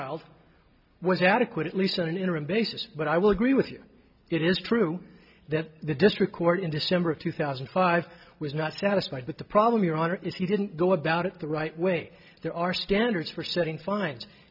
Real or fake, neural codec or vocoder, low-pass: real; none; 5.4 kHz